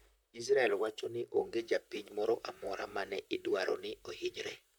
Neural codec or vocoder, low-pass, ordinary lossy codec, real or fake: vocoder, 44.1 kHz, 128 mel bands, Pupu-Vocoder; none; none; fake